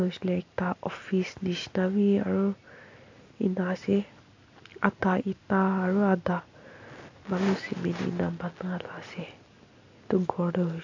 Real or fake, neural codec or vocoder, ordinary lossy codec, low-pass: real; none; AAC, 48 kbps; 7.2 kHz